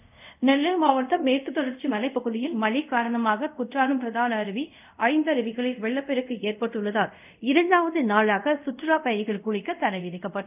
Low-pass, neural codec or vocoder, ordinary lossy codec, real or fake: 3.6 kHz; codec, 24 kHz, 0.5 kbps, DualCodec; none; fake